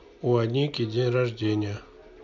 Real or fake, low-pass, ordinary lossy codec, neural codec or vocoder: real; 7.2 kHz; MP3, 64 kbps; none